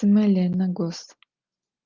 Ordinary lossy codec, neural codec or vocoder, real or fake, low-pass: Opus, 32 kbps; none; real; 7.2 kHz